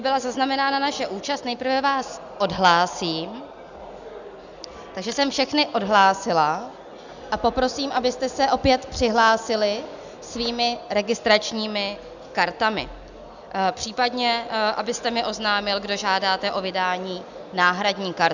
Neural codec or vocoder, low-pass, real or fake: none; 7.2 kHz; real